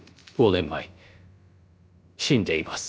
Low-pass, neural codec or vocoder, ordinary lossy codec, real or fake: none; codec, 16 kHz, about 1 kbps, DyCAST, with the encoder's durations; none; fake